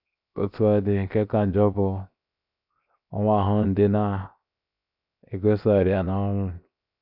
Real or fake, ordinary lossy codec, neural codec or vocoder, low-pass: fake; none; codec, 16 kHz, 0.7 kbps, FocalCodec; 5.4 kHz